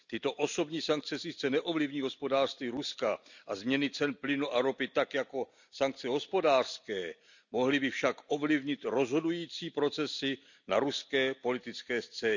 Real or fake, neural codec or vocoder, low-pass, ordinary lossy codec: real; none; 7.2 kHz; none